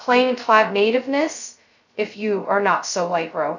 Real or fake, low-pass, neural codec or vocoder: fake; 7.2 kHz; codec, 16 kHz, 0.2 kbps, FocalCodec